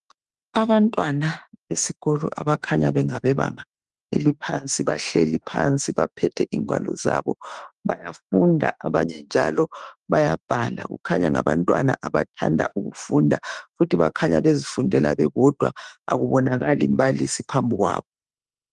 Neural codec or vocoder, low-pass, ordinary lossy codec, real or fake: autoencoder, 48 kHz, 32 numbers a frame, DAC-VAE, trained on Japanese speech; 10.8 kHz; Opus, 24 kbps; fake